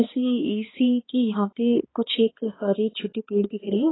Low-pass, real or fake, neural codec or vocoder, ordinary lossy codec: 7.2 kHz; fake; codec, 16 kHz, 2 kbps, X-Codec, HuBERT features, trained on balanced general audio; AAC, 16 kbps